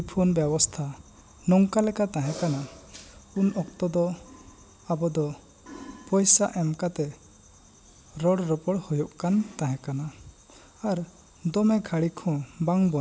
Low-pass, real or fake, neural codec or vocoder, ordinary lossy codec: none; real; none; none